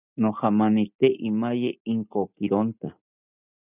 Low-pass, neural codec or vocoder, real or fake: 3.6 kHz; none; real